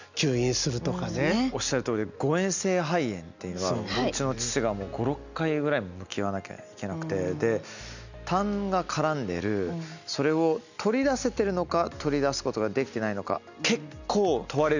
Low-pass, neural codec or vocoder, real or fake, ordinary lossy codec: 7.2 kHz; none; real; none